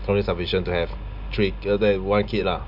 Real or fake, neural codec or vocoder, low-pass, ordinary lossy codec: real; none; 5.4 kHz; none